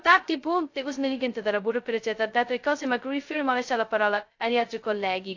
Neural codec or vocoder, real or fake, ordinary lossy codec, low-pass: codec, 16 kHz, 0.2 kbps, FocalCodec; fake; AAC, 48 kbps; 7.2 kHz